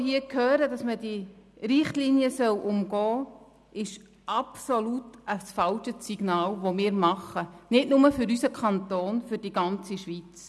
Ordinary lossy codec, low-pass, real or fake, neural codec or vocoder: none; none; real; none